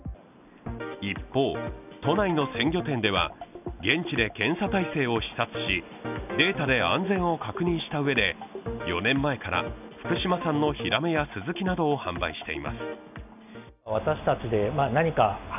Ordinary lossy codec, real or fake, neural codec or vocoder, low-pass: none; real; none; 3.6 kHz